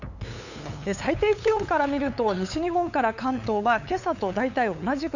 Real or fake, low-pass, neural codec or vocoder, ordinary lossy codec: fake; 7.2 kHz; codec, 16 kHz, 8 kbps, FunCodec, trained on LibriTTS, 25 frames a second; none